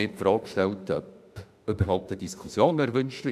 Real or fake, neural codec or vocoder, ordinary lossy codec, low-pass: fake; autoencoder, 48 kHz, 32 numbers a frame, DAC-VAE, trained on Japanese speech; none; 14.4 kHz